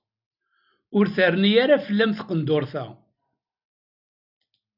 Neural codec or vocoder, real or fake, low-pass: none; real; 5.4 kHz